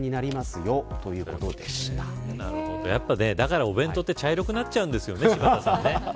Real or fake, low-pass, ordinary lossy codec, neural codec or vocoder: real; none; none; none